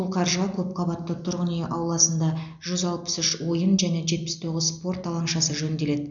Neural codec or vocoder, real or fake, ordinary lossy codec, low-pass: none; real; none; 7.2 kHz